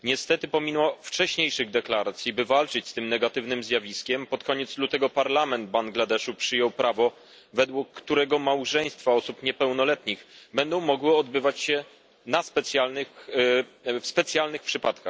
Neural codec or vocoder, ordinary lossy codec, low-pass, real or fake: none; none; none; real